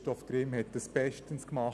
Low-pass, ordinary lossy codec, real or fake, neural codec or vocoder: none; none; real; none